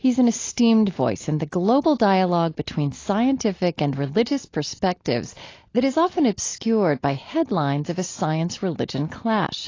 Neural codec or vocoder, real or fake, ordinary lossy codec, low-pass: none; real; AAC, 32 kbps; 7.2 kHz